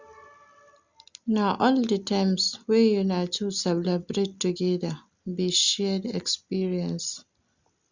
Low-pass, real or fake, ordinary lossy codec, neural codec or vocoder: 7.2 kHz; real; Opus, 64 kbps; none